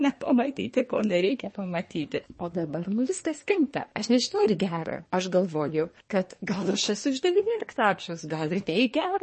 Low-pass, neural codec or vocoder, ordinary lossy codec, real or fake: 10.8 kHz; codec, 24 kHz, 1 kbps, SNAC; MP3, 32 kbps; fake